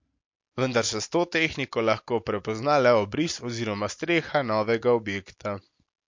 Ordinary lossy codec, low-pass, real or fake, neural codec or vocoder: MP3, 48 kbps; 7.2 kHz; real; none